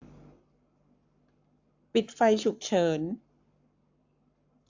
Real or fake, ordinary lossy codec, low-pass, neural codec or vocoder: fake; AAC, 48 kbps; 7.2 kHz; codec, 44.1 kHz, 7.8 kbps, Pupu-Codec